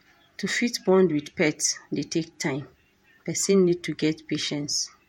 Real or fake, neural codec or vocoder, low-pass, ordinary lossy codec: fake; vocoder, 48 kHz, 128 mel bands, Vocos; 19.8 kHz; MP3, 64 kbps